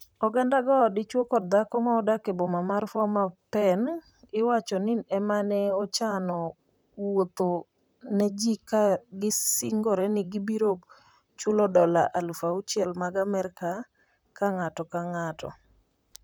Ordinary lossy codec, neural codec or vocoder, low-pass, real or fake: none; vocoder, 44.1 kHz, 128 mel bands, Pupu-Vocoder; none; fake